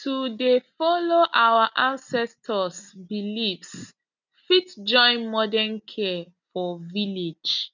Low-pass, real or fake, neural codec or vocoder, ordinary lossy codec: 7.2 kHz; real; none; none